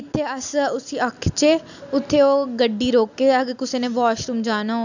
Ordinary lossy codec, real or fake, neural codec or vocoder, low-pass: none; real; none; 7.2 kHz